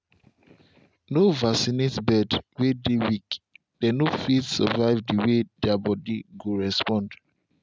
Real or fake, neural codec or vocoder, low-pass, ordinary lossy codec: real; none; none; none